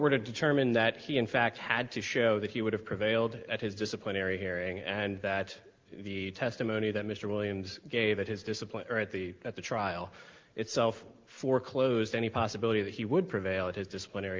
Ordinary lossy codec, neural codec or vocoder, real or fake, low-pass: Opus, 32 kbps; none; real; 7.2 kHz